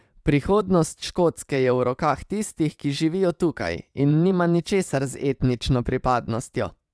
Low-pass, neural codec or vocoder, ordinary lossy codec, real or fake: none; vocoder, 22.05 kHz, 80 mel bands, WaveNeXt; none; fake